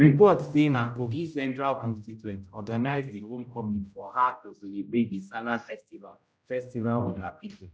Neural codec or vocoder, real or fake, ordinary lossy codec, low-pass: codec, 16 kHz, 0.5 kbps, X-Codec, HuBERT features, trained on general audio; fake; none; none